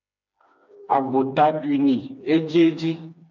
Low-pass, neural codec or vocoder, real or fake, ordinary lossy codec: 7.2 kHz; codec, 16 kHz, 2 kbps, FreqCodec, smaller model; fake; MP3, 64 kbps